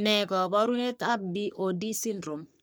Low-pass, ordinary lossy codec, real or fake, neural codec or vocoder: none; none; fake; codec, 44.1 kHz, 3.4 kbps, Pupu-Codec